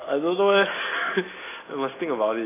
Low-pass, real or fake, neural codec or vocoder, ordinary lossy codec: 3.6 kHz; real; none; AAC, 16 kbps